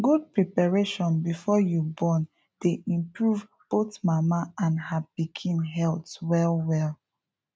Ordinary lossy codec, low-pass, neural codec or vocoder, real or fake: none; none; none; real